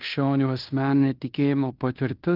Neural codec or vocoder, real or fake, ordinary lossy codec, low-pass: codec, 16 kHz in and 24 kHz out, 0.9 kbps, LongCat-Audio-Codec, fine tuned four codebook decoder; fake; Opus, 24 kbps; 5.4 kHz